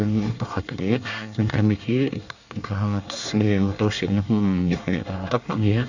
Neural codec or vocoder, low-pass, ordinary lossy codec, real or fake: codec, 24 kHz, 1 kbps, SNAC; 7.2 kHz; none; fake